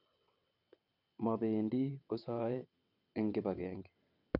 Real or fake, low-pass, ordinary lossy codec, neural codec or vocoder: fake; 5.4 kHz; none; vocoder, 22.05 kHz, 80 mel bands, WaveNeXt